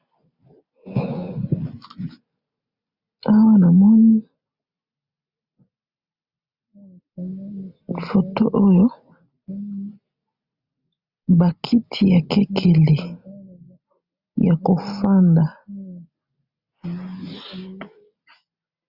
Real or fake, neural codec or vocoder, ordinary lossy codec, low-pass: real; none; Opus, 64 kbps; 5.4 kHz